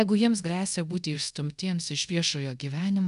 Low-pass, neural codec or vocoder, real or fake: 10.8 kHz; codec, 24 kHz, 0.5 kbps, DualCodec; fake